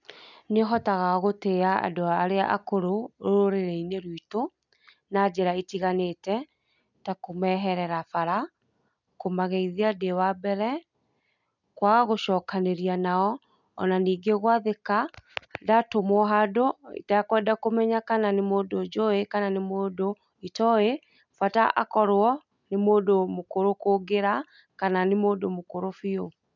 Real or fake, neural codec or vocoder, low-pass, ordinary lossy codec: real; none; 7.2 kHz; none